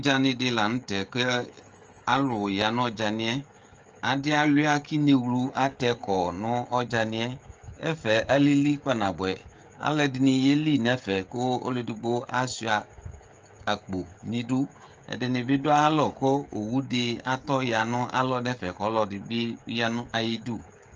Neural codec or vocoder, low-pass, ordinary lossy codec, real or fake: codec, 16 kHz, 16 kbps, FreqCodec, smaller model; 7.2 kHz; Opus, 24 kbps; fake